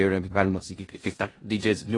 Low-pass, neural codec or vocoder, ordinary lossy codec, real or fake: 10.8 kHz; codec, 16 kHz in and 24 kHz out, 0.4 kbps, LongCat-Audio-Codec, four codebook decoder; AAC, 32 kbps; fake